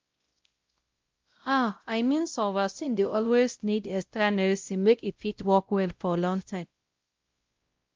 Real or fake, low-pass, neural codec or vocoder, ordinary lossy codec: fake; 7.2 kHz; codec, 16 kHz, 0.5 kbps, X-Codec, WavLM features, trained on Multilingual LibriSpeech; Opus, 32 kbps